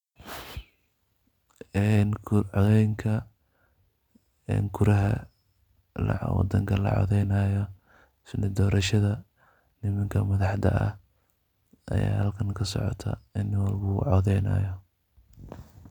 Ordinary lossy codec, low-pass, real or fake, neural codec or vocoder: none; 19.8 kHz; real; none